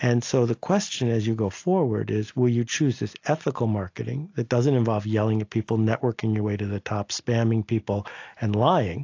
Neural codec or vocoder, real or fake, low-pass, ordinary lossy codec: none; real; 7.2 kHz; AAC, 48 kbps